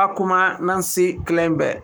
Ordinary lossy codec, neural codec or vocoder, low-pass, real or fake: none; codec, 44.1 kHz, 7.8 kbps, Pupu-Codec; none; fake